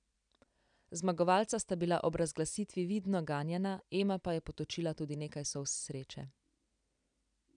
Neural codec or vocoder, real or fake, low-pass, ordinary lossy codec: none; real; 9.9 kHz; none